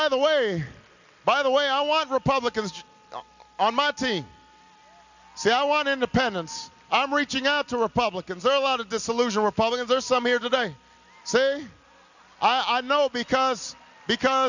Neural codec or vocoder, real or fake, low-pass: none; real; 7.2 kHz